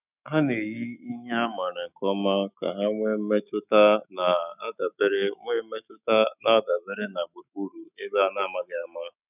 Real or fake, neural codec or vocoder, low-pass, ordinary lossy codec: fake; autoencoder, 48 kHz, 128 numbers a frame, DAC-VAE, trained on Japanese speech; 3.6 kHz; none